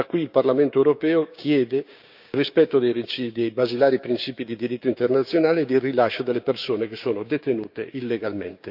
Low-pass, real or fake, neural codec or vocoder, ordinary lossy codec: 5.4 kHz; fake; codec, 16 kHz, 6 kbps, DAC; none